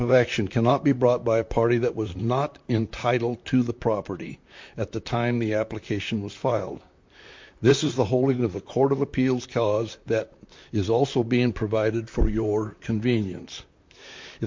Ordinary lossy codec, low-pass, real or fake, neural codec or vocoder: MP3, 48 kbps; 7.2 kHz; fake; vocoder, 44.1 kHz, 128 mel bands, Pupu-Vocoder